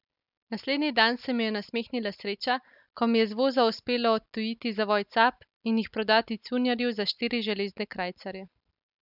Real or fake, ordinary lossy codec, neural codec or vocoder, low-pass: real; none; none; 5.4 kHz